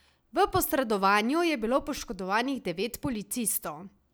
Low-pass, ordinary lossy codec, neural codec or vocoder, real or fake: none; none; none; real